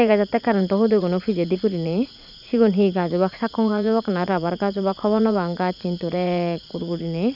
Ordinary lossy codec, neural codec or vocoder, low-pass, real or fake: none; vocoder, 44.1 kHz, 80 mel bands, Vocos; 5.4 kHz; fake